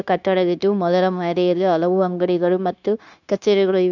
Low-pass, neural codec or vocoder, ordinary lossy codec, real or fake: 7.2 kHz; codec, 16 kHz, 0.9 kbps, LongCat-Audio-Codec; none; fake